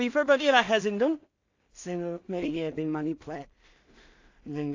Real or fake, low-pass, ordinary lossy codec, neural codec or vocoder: fake; 7.2 kHz; AAC, 48 kbps; codec, 16 kHz in and 24 kHz out, 0.4 kbps, LongCat-Audio-Codec, two codebook decoder